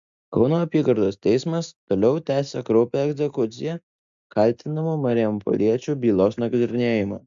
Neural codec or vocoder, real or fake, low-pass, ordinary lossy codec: none; real; 7.2 kHz; MP3, 64 kbps